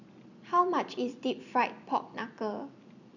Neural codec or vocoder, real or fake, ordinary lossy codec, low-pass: none; real; none; 7.2 kHz